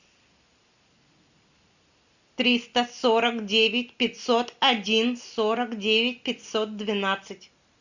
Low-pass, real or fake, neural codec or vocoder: 7.2 kHz; real; none